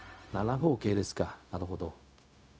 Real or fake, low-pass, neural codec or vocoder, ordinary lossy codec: fake; none; codec, 16 kHz, 0.4 kbps, LongCat-Audio-Codec; none